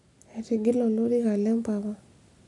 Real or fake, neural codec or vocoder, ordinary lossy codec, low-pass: real; none; none; 10.8 kHz